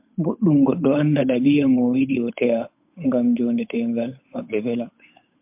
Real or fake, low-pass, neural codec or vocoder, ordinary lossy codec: fake; 3.6 kHz; codec, 16 kHz, 8 kbps, FunCodec, trained on Chinese and English, 25 frames a second; MP3, 32 kbps